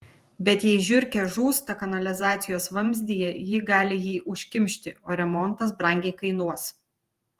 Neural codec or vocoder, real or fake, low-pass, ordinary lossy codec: vocoder, 48 kHz, 128 mel bands, Vocos; fake; 14.4 kHz; Opus, 24 kbps